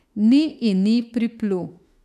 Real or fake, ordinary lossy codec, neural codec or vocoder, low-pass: fake; none; autoencoder, 48 kHz, 32 numbers a frame, DAC-VAE, trained on Japanese speech; 14.4 kHz